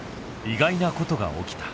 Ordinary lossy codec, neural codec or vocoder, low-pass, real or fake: none; none; none; real